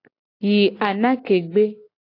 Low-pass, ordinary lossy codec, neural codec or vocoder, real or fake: 5.4 kHz; AAC, 32 kbps; none; real